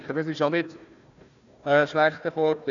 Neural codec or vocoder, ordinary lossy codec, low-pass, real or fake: codec, 16 kHz, 1 kbps, FunCodec, trained on Chinese and English, 50 frames a second; none; 7.2 kHz; fake